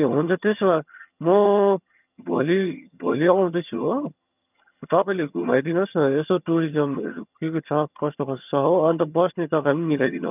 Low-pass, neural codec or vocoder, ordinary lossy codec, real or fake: 3.6 kHz; vocoder, 22.05 kHz, 80 mel bands, HiFi-GAN; none; fake